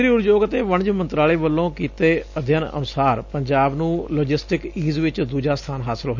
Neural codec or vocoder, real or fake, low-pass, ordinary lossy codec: none; real; 7.2 kHz; none